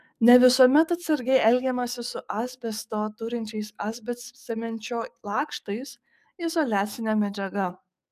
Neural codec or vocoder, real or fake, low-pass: codec, 44.1 kHz, 7.8 kbps, DAC; fake; 14.4 kHz